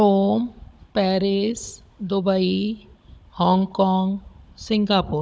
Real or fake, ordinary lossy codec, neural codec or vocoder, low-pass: fake; none; codec, 16 kHz, 16 kbps, FunCodec, trained on Chinese and English, 50 frames a second; none